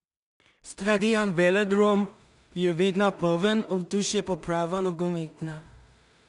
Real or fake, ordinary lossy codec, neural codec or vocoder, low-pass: fake; none; codec, 16 kHz in and 24 kHz out, 0.4 kbps, LongCat-Audio-Codec, two codebook decoder; 10.8 kHz